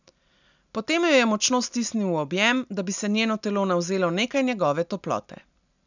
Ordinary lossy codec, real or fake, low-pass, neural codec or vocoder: none; real; 7.2 kHz; none